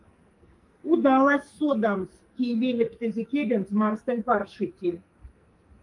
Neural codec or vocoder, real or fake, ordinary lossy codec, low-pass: codec, 44.1 kHz, 2.6 kbps, SNAC; fake; Opus, 32 kbps; 10.8 kHz